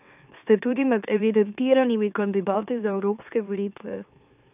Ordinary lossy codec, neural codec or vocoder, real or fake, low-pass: none; autoencoder, 44.1 kHz, a latent of 192 numbers a frame, MeloTTS; fake; 3.6 kHz